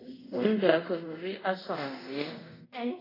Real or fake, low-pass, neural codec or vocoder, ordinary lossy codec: fake; 5.4 kHz; codec, 24 kHz, 0.5 kbps, DualCodec; AAC, 24 kbps